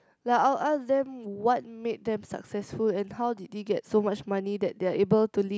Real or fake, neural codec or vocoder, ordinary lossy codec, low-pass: real; none; none; none